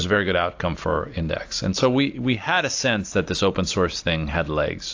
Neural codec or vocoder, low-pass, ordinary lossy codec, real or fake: none; 7.2 kHz; AAC, 48 kbps; real